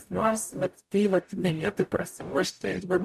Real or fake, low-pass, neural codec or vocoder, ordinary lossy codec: fake; 14.4 kHz; codec, 44.1 kHz, 0.9 kbps, DAC; MP3, 96 kbps